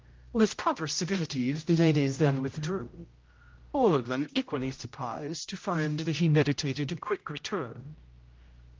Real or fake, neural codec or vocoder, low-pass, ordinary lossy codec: fake; codec, 16 kHz, 0.5 kbps, X-Codec, HuBERT features, trained on general audio; 7.2 kHz; Opus, 24 kbps